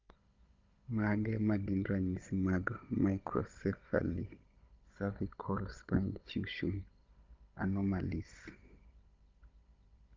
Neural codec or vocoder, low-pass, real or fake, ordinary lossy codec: codec, 16 kHz, 16 kbps, FunCodec, trained on Chinese and English, 50 frames a second; 7.2 kHz; fake; Opus, 24 kbps